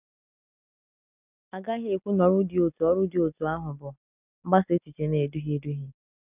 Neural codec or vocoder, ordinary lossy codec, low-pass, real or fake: none; none; 3.6 kHz; real